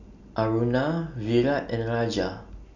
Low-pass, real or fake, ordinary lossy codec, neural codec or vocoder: 7.2 kHz; real; none; none